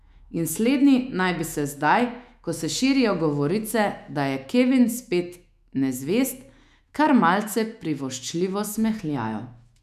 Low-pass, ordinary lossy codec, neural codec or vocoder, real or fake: 14.4 kHz; none; autoencoder, 48 kHz, 128 numbers a frame, DAC-VAE, trained on Japanese speech; fake